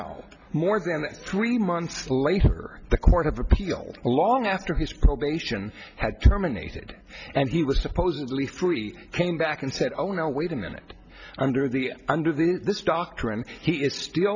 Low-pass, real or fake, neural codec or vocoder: 7.2 kHz; real; none